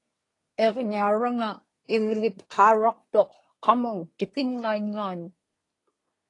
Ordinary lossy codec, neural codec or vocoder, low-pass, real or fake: AAC, 32 kbps; codec, 24 kHz, 1 kbps, SNAC; 10.8 kHz; fake